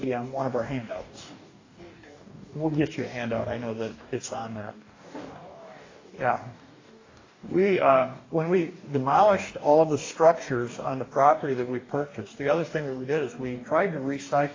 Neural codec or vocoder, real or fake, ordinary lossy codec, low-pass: codec, 44.1 kHz, 2.6 kbps, DAC; fake; AAC, 32 kbps; 7.2 kHz